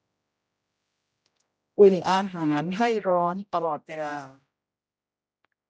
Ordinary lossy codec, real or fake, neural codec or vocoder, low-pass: none; fake; codec, 16 kHz, 0.5 kbps, X-Codec, HuBERT features, trained on general audio; none